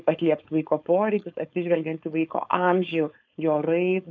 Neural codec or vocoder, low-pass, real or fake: codec, 16 kHz, 4.8 kbps, FACodec; 7.2 kHz; fake